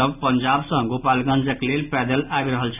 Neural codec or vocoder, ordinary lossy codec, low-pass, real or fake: none; none; 3.6 kHz; real